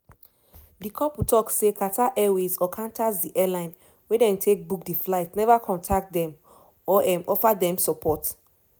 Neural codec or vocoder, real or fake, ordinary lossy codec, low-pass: none; real; none; none